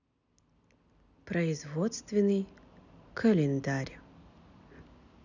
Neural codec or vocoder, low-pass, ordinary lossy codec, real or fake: none; 7.2 kHz; none; real